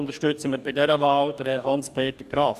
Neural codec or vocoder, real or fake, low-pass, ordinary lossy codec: codec, 44.1 kHz, 2.6 kbps, DAC; fake; 14.4 kHz; none